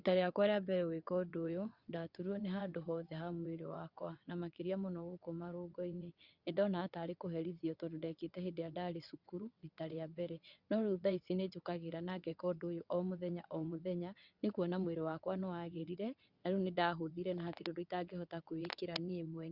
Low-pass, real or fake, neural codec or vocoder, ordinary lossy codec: 5.4 kHz; fake; vocoder, 22.05 kHz, 80 mel bands, WaveNeXt; Opus, 64 kbps